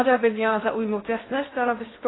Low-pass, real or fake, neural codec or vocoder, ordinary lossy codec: 7.2 kHz; fake; codec, 16 kHz in and 24 kHz out, 0.6 kbps, FocalCodec, streaming, 4096 codes; AAC, 16 kbps